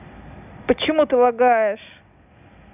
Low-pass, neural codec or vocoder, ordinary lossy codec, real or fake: 3.6 kHz; none; none; real